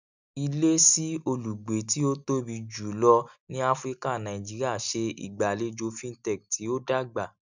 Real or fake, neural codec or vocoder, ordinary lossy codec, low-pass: real; none; none; 7.2 kHz